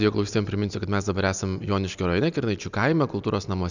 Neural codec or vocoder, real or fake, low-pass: none; real; 7.2 kHz